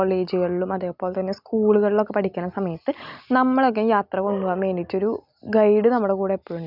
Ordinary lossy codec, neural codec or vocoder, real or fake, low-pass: none; none; real; 5.4 kHz